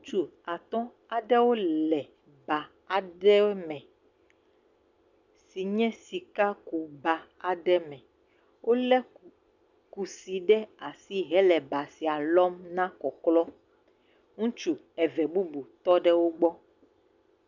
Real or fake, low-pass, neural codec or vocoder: real; 7.2 kHz; none